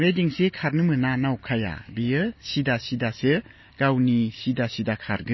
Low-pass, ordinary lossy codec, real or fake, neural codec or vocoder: 7.2 kHz; MP3, 24 kbps; real; none